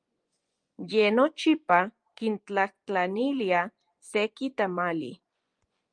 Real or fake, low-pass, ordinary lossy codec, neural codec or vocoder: fake; 9.9 kHz; Opus, 32 kbps; vocoder, 24 kHz, 100 mel bands, Vocos